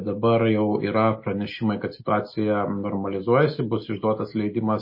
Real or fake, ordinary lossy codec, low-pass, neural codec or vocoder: real; MP3, 24 kbps; 5.4 kHz; none